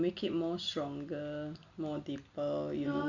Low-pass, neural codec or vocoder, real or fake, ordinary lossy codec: 7.2 kHz; none; real; none